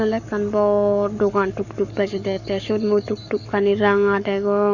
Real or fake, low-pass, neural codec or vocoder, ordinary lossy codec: fake; 7.2 kHz; codec, 44.1 kHz, 7.8 kbps, Pupu-Codec; none